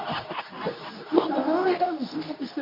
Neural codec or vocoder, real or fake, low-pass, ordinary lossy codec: codec, 24 kHz, 0.9 kbps, WavTokenizer, medium speech release version 2; fake; 5.4 kHz; none